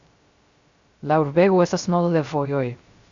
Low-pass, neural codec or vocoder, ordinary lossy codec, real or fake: 7.2 kHz; codec, 16 kHz, 0.3 kbps, FocalCodec; Opus, 64 kbps; fake